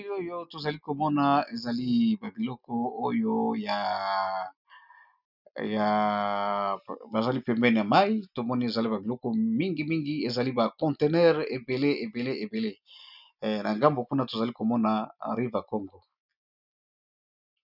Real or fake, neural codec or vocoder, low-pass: real; none; 5.4 kHz